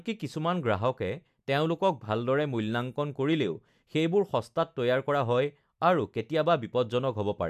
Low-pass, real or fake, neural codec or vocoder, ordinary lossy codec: 14.4 kHz; real; none; AAC, 96 kbps